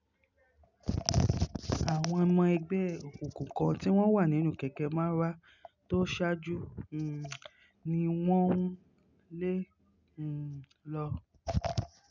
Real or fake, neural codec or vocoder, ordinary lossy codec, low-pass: real; none; none; 7.2 kHz